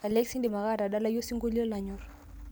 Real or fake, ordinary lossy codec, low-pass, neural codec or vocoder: real; none; none; none